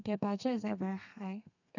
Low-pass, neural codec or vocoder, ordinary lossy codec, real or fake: 7.2 kHz; codec, 32 kHz, 1.9 kbps, SNAC; none; fake